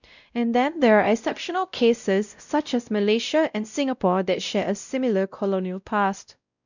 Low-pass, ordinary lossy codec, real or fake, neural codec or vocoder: 7.2 kHz; none; fake; codec, 16 kHz, 0.5 kbps, X-Codec, WavLM features, trained on Multilingual LibriSpeech